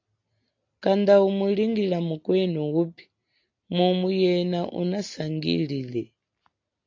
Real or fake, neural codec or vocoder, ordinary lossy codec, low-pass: real; none; AAC, 48 kbps; 7.2 kHz